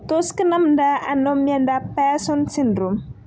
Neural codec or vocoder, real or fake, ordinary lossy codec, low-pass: none; real; none; none